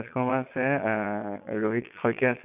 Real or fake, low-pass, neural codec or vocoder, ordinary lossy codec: fake; 3.6 kHz; vocoder, 22.05 kHz, 80 mel bands, Vocos; none